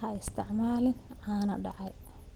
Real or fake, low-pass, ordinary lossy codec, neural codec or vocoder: real; 19.8 kHz; Opus, 16 kbps; none